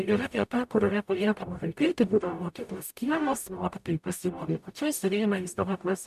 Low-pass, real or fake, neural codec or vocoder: 14.4 kHz; fake; codec, 44.1 kHz, 0.9 kbps, DAC